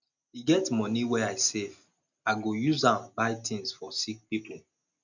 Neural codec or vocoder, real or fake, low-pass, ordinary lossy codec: none; real; 7.2 kHz; none